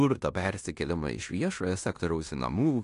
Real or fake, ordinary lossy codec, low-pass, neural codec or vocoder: fake; AAC, 48 kbps; 10.8 kHz; codec, 16 kHz in and 24 kHz out, 0.9 kbps, LongCat-Audio-Codec, fine tuned four codebook decoder